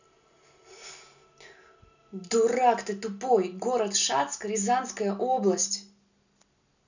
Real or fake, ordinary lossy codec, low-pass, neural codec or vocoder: real; none; 7.2 kHz; none